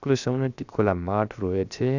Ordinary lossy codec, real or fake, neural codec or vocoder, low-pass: none; fake; codec, 16 kHz, 0.7 kbps, FocalCodec; 7.2 kHz